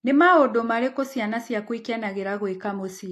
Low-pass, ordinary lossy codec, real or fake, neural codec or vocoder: 14.4 kHz; AAC, 64 kbps; real; none